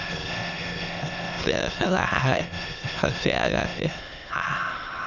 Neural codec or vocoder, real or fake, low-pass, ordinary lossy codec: autoencoder, 22.05 kHz, a latent of 192 numbers a frame, VITS, trained on many speakers; fake; 7.2 kHz; none